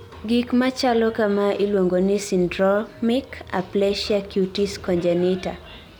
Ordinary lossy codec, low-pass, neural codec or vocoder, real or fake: none; none; none; real